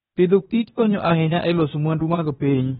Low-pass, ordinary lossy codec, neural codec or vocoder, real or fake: 7.2 kHz; AAC, 16 kbps; codec, 16 kHz, 0.8 kbps, ZipCodec; fake